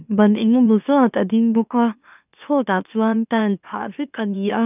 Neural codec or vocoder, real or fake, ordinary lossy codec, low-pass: autoencoder, 44.1 kHz, a latent of 192 numbers a frame, MeloTTS; fake; none; 3.6 kHz